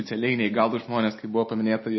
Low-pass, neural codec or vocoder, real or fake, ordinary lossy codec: 7.2 kHz; none; real; MP3, 24 kbps